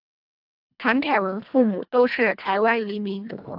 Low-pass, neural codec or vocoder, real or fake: 5.4 kHz; codec, 24 kHz, 1.5 kbps, HILCodec; fake